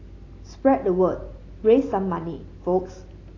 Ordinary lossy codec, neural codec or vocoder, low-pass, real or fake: AAC, 32 kbps; none; 7.2 kHz; real